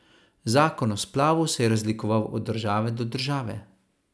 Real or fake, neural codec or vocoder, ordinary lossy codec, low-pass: real; none; none; none